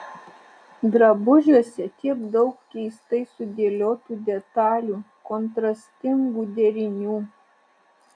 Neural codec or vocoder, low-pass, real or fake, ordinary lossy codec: none; 9.9 kHz; real; AAC, 64 kbps